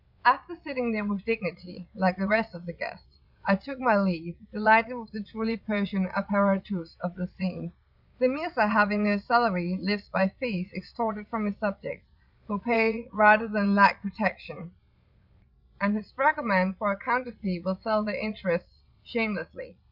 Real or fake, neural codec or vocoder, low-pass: fake; vocoder, 22.05 kHz, 80 mel bands, Vocos; 5.4 kHz